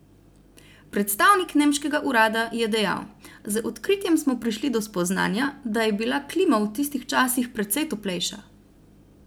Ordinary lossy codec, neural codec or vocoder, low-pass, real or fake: none; none; none; real